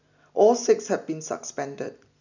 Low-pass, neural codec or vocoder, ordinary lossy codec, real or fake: 7.2 kHz; none; none; real